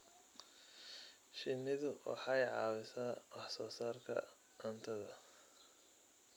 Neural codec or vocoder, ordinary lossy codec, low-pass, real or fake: none; none; none; real